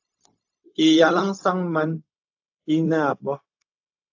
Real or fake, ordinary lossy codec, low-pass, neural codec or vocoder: fake; AAC, 48 kbps; 7.2 kHz; codec, 16 kHz, 0.4 kbps, LongCat-Audio-Codec